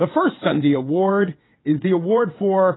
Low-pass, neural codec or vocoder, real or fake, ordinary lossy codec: 7.2 kHz; vocoder, 22.05 kHz, 80 mel bands, WaveNeXt; fake; AAC, 16 kbps